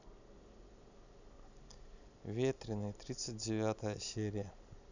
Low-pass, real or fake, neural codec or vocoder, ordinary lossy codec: 7.2 kHz; real; none; none